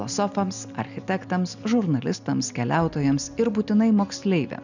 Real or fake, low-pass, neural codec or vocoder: real; 7.2 kHz; none